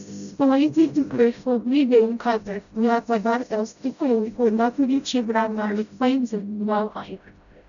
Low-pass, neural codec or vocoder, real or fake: 7.2 kHz; codec, 16 kHz, 0.5 kbps, FreqCodec, smaller model; fake